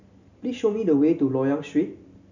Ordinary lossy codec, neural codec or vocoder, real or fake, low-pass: none; none; real; 7.2 kHz